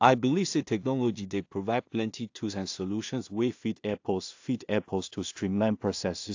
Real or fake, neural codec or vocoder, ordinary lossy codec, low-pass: fake; codec, 16 kHz in and 24 kHz out, 0.4 kbps, LongCat-Audio-Codec, two codebook decoder; AAC, 48 kbps; 7.2 kHz